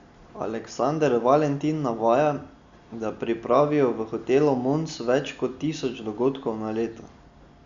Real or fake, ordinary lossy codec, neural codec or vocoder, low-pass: real; Opus, 64 kbps; none; 7.2 kHz